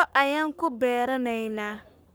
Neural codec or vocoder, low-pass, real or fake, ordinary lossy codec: codec, 44.1 kHz, 3.4 kbps, Pupu-Codec; none; fake; none